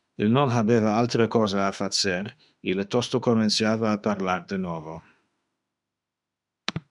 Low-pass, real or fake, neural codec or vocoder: 10.8 kHz; fake; autoencoder, 48 kHz, 32 numbers a frame, DAC-VAE, trained on Japanese speech